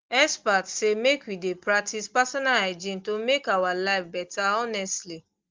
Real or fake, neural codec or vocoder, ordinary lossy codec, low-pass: real; none; Opus, 32 kbps; 7.2 kHz